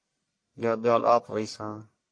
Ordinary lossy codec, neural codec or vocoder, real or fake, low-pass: MP3, 48 kbps; codec, 44.1 kHz, 1.7 kbps, Pupu-Codec; fake; 9.9 kHz